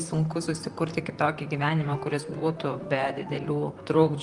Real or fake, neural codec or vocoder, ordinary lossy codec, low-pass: fake; vocoder, 44.1 kHz, 128 mel bands, Pupu-Vocoder; Opus, 32 kbps; 10.8 kHz